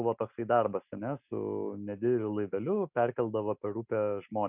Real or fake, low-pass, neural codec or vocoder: real; 3.6 kHz; none